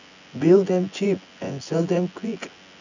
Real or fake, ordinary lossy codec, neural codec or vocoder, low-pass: fake; none; vocoder, 24 kHz, 100 mel bands, Vocos; 7.2 kHz